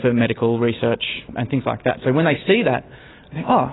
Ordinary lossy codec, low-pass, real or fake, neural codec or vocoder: AAC, 16 kbps; 7.2 kHz; real; none